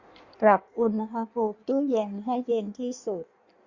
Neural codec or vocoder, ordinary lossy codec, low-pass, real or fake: codec, 16 kHz in and 24 kHz out, 1.1 kbps, FireRedTTS-2 codec; Opus, 64 kbps; 7.2 kHz; fake